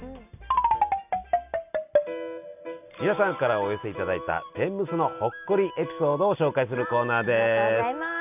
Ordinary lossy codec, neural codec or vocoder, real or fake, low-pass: none; none; real; 3.6 kHz